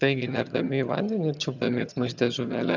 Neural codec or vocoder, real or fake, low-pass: vocoder, 22.05 kHz, 80 mel bands, HiFi-GAN; fake; 7.2 kHz